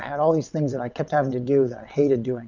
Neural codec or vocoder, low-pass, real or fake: vocoder, 22.05 kHz, 80 mel bands, WaveNeXt; 7.2 kHz; fake